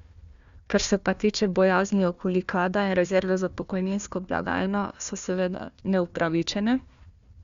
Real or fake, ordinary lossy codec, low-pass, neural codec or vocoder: fake; Opus, 64 kbps; 7.2 kHz; codec, 16 kHz, 1 kbps, FunCodec, trained on Chinese and English, 50 frames a second